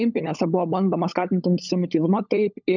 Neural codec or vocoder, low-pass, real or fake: codec, 16 kHz, 16 kbps, FunCodec, trained on LibriTTS, 50 frames a second; 7.2 kHz; fake